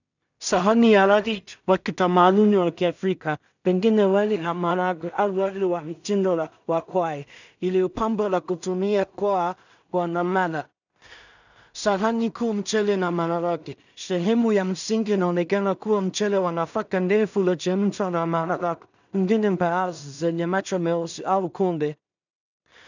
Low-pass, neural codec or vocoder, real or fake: 7.2 kHz; codec, 16 kHz in and 24 kHz out, 0.4 kbps, LongCat-Audio-Codec, two codebook decoder; fake